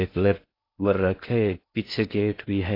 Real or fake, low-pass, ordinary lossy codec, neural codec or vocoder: fake; 5.4 kHz; AAC, 32 kbps; codec, 16 kHz in and 24 kHz out, 0.6 kbps, FocalCodec, streaming, 4096 codes